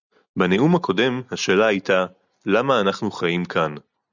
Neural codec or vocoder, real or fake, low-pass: none; real; 7.2 kHz